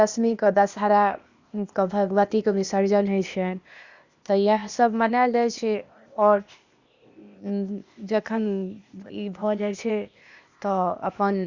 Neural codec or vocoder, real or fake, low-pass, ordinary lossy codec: codec, 16 kHz, 0.8 kbps, ZipCodec; fake; 7.2 kHz; Opus, 64 kbps